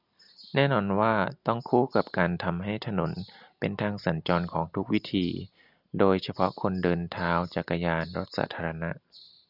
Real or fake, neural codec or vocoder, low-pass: real; none; 5.4 kHz